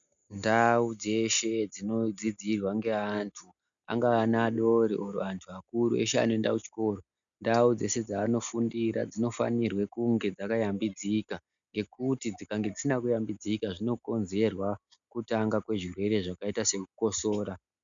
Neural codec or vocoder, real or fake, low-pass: none; real; 7.2 kHz